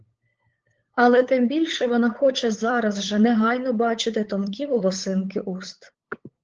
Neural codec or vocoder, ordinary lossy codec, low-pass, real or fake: codec, 16 kHz, 8 kbps, FunCodec, trained on LibriTTS, 25 frames a second; Opus, 16 kbps; 7.2 kHz; fake